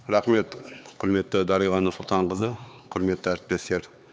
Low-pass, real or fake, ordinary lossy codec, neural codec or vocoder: none; fake; none; codec, 16 kHz, 4 kbps, X-Codec, HuBERT features, trained on LibriSpeech